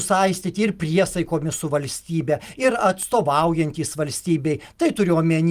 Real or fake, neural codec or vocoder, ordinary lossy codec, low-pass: real; none; Opus, 32 kbps; 14.4 kHz